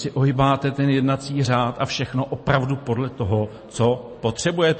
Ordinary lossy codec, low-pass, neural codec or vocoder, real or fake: MP3, 32 kbps; 10.8 kHz; none; real